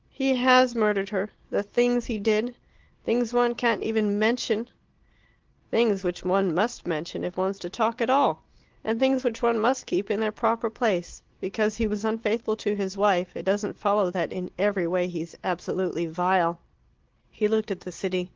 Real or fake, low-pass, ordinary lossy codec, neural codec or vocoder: real; 7.2 kHz; Opus, 16 kbps; none